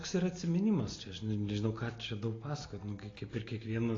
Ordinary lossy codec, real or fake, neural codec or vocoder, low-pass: AAC, 32 kbps; real; none; 7.2 kHz